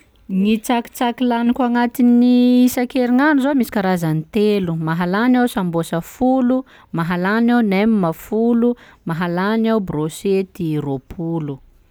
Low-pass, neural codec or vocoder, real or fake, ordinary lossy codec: none; none; real; none